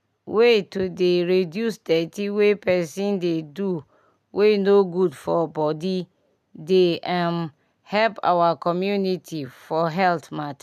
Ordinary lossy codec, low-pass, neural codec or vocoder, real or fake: none; 14.4 kHz; none; real